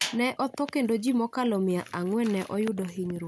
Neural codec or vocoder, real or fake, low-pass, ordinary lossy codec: none; real; none; none